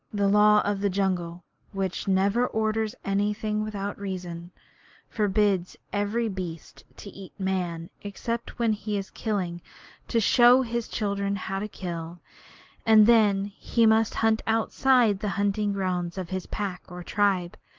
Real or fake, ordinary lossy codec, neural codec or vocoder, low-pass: real; Opus, 32 kbps; none; 7.2 kHz